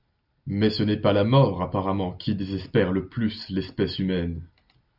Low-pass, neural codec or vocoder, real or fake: 5.4 kHz; none; real